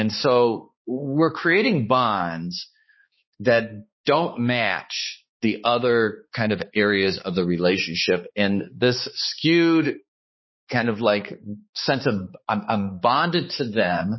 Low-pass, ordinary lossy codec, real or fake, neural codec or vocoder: 7.2 kHz; MP3, 24 kbps; fake; codec, 16 kHz, 4 kbps, X-Codec, HuBERT features, trained on balanced general audio